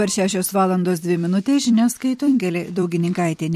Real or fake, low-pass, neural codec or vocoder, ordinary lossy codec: fake; 14.4 kHz; vocoder, 44.1 kHz, 128 mel bands every 256 samples, BigVGAN v2; MP3, 64 kbps